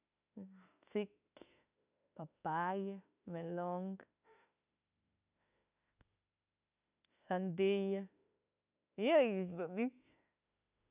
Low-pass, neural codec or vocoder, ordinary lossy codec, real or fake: 3.6 kHz; autoencoder, 48 kHz, 32 numbers a frame, DAC-VAE, trained on Japanese speech; none; fake